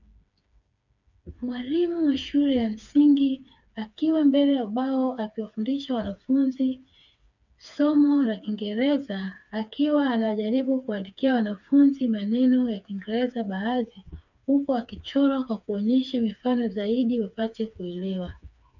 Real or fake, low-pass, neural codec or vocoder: fake; 7.2 kHz; codec, 16 kHz, 4 kbps, FreqCodec, smaller model